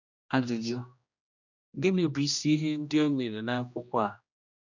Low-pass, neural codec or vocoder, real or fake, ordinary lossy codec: 7.2 kHz; codec, 16 kHz, 1 kbps, X-Codec, HuBERT features, trained on general audio; fake; none